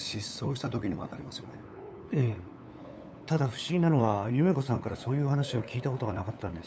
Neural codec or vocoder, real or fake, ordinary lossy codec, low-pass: codec, 16 kHz, 8 kbps, FunCodec, trained on LibriTTS, 25 frames a second; fake; none; none